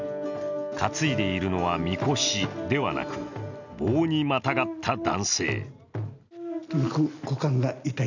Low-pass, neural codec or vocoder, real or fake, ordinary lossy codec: 7.2 kHz; none; real; none